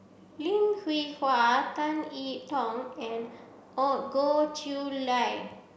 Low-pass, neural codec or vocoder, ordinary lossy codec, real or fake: none; none; none; real